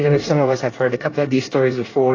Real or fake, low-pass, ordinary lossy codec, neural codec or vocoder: fake; 7.2 kHz; AAC, 32 kbps; codec, 24 kHz, 1 kbps, SNAC